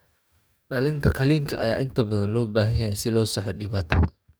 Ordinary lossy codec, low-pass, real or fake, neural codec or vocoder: none; none; fake; codec, 44.1 kHz, 2.6 kbps, DAC